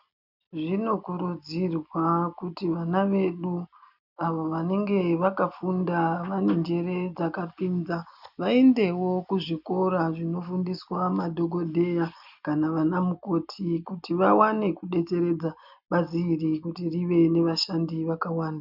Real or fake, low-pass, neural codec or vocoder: real; 5.4 kHz; none